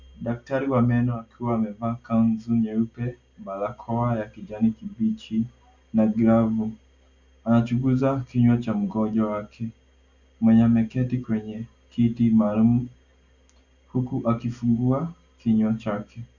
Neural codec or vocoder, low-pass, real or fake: none; 7.2 kHz; real